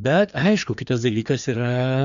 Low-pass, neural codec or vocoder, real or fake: 7.2 kHz; codec, 16 kHz, 2 kbps, FreqCodec, larger model; fake